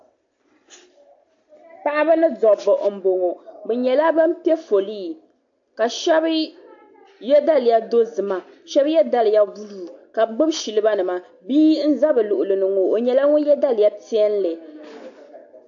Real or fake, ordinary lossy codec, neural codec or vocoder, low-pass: real; AAC, 64 kbps; none; 7.2 kHz